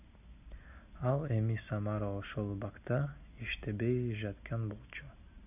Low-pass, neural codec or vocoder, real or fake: 3.6 kHz; none; real